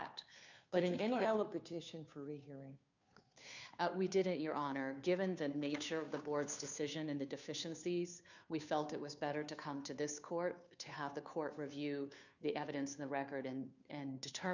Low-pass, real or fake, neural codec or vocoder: 7.2 kHz; fake; codec, 16 kHz, 2 kbps, FunCodec, trained on Chinese and English, 25 frames a second